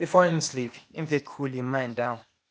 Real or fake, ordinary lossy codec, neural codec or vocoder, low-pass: fake; none; codec, 16 kHz, 0.8 kbps, ZipCodec; none